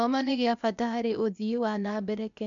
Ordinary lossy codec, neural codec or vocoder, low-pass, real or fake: none; codec, 16 kHz, 0.8 kbps, ZipCodec; 7.2 kHz; fake